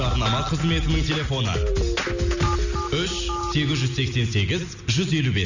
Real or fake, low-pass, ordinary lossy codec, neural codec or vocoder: real; 7.2 kHz; none; none